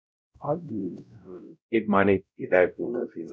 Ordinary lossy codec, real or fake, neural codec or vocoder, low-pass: none; fake; codec, 16 kHz, 0.5 kbps, X-Codec, WavLM features, trained on Multilingual LibriSpeech; none